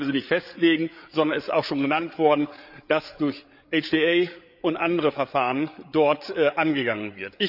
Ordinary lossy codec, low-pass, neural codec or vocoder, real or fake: none; 5.4 kHz; codec, 16 kHz, 8 kbps, FreqCodec, larger model; fake